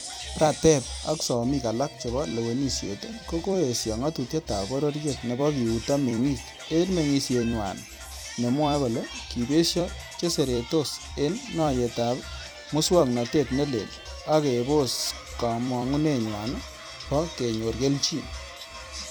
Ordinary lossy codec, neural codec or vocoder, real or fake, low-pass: none; vocoder, 44.1 kHz, 128 mel bands every 256 samples, BigVGAN v2; fake; none